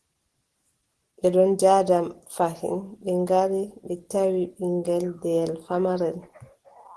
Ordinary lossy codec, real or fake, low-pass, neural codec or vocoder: Opus, 16 kbps; real; 10.8 kHz; none